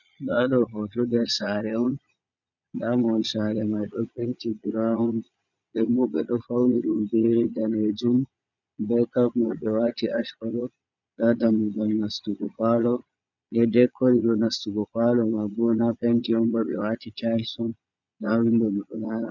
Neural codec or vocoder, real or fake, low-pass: vocoder, 22.05 kHz, 80 mel bands, Vocos; fake; 7.2 kHz